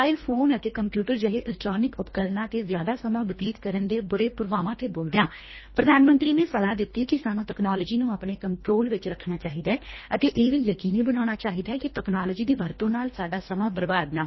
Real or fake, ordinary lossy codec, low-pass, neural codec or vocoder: fake; MP3, 24 kbps; 7.2 kHz; codec, 24 kHz, 1.5 kbps, HILCodec